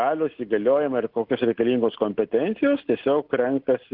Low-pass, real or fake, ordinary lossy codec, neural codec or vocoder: 5.4 kHz; real; Opus, 16 kbps; none